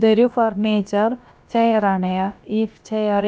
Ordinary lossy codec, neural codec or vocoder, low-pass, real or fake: none; codec, 16 kHz, about 1 kbps, DyCAST, with the encoder's durations; none; fake